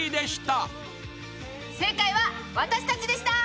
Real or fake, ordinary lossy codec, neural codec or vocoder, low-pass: real; none; none; none